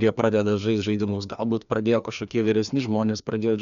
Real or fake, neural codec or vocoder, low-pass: fake; codec, 16 kHz, 2 kbps, FreqCodec, larger model; 7.2 kHz